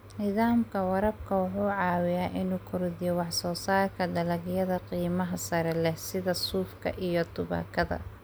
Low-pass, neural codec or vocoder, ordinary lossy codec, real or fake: none; none; none; real